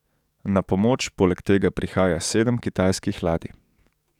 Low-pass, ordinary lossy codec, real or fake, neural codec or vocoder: 19.8 kHz; none; fake; codec, 44.1 kHz, 7.8 kbps, DAC